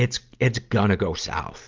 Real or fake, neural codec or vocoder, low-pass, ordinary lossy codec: real; none; 7.2 kHz; Opus, 32 kbps